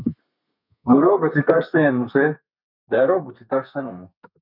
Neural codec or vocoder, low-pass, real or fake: codec, 32 kHz, 1.9 kbps, SNAC; 5.4 kHz; fake